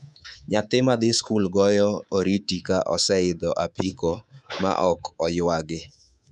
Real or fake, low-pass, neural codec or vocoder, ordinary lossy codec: fake; none; codec, 24 kHz, 3.1 kbps, DualCodec; none